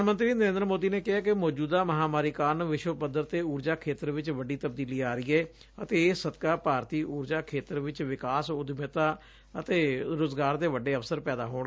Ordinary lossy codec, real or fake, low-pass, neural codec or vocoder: none; real; none; none